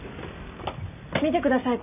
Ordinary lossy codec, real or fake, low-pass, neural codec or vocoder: none; real; 3.6 kHz; none